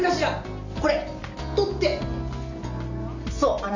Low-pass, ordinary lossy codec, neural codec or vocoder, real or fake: 7.2 kHz; Opus, 64 kbps; none; real